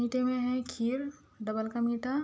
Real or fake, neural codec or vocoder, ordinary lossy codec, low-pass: real; none; none; none